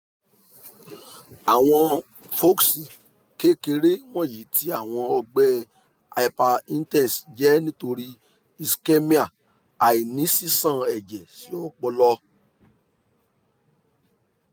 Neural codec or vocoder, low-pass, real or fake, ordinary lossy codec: none; none; real; none